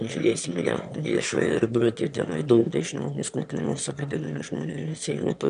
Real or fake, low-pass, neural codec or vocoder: fake; 9.9 kHz; autoencoder, 22.05 kHz, a latent of 192 numbers a frame, VITS, trained on one speaker